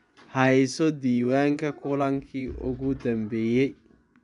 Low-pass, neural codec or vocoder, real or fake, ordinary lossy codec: 10.8 kHz; vocoder, 24 kHz, 100 mel bands, Vocos; fake; none